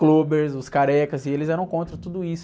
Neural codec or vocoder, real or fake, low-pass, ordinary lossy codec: none; real; none; none